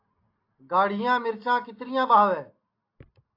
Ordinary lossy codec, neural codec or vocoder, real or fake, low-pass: AAC, 32 kbps; none; real; 5.4 kHz